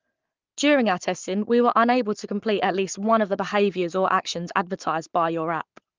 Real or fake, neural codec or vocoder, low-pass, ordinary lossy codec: fake; codec, 44.1 kHz, 7.8 kbps, Pupu-Codec; 7.2 kHz; Opus, 32 kbps